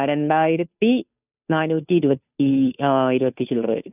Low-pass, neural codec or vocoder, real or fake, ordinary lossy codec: 3.6 kHz; codec, 16 kHz, 2 kbps, FunCodec, trained on Chinese and English, 25 frames a second; fake; none